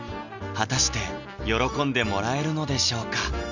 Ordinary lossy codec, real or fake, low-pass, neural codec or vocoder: none; real; 7.2 kHz; none